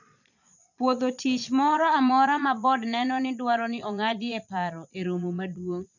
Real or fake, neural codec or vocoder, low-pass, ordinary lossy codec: fake; vocoder, 22.05 kHz, 80 mel bands, Vocos; 7.2 kHz; none